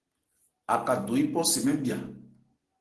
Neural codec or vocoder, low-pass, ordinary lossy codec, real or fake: none; 10.8 kHz; Opus, 16 kbps; real